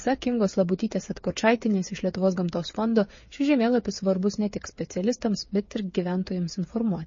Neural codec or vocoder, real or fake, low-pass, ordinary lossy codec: codec, 16 kHz, 8 kbps, FreqCodec, smaller model; fake; 7.2 kHz; MP3, 32 kbps